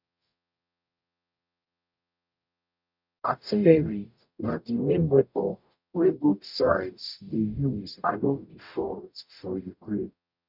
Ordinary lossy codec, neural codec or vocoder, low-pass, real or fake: none; codec, 44.1 kHz, 0.9 kbps, DAC; 5.4 kHz; fake